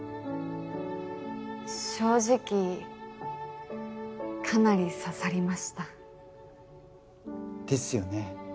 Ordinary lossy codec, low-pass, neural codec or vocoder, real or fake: none; none; none; real